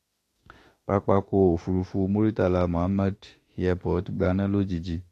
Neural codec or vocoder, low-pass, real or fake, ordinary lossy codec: autoencoder, 48 kHz, 32 numbers a frame, DAC-VAE, trained on Japanese speech; 14.4 kHz; fake; AAC, 48 kbps